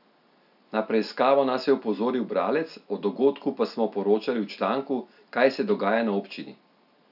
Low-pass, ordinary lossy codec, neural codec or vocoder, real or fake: 5.4 kHz; AAC, 48 kbps; none; real